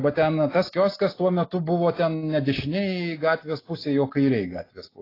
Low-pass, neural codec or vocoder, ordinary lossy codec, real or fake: 5.4 kHz; none; AAC, 24 kbps; real